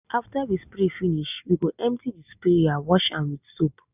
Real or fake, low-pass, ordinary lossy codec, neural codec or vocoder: real; 3.6 kHz; none; none